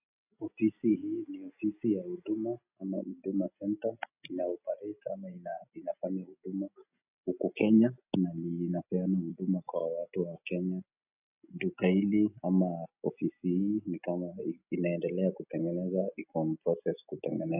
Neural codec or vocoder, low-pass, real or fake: none; 3.6 kHz; real